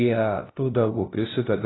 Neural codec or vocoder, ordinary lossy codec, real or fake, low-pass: codec, 16 kHz, 0.5 kbps, FunCodec, trained on LibriTTS, 25 frames a second; AAC, 16 kbps; fake; 7.2 kHz